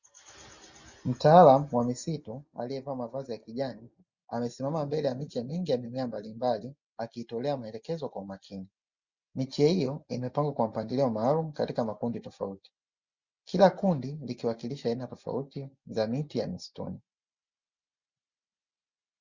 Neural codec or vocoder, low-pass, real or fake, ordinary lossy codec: none; 7.2 kHz; real; Opus, 64 kbps